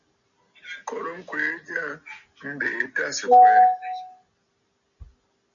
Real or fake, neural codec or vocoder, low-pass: real; none; 7.2 kHz